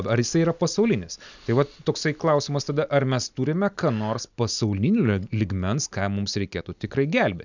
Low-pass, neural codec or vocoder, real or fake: 7.2 kHz; none; real